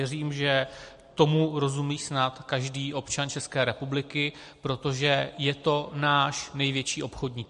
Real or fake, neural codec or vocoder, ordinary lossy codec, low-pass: real; none; MP3, 48 kbps; 10.8 kHz